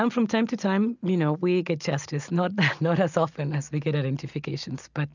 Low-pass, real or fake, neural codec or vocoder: 7.2 kHz; real; none